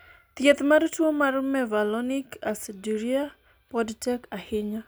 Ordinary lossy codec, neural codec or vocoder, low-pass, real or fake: none; none; none; real